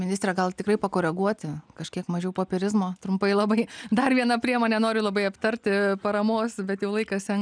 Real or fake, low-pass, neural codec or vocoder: real; 9.9 kHz; none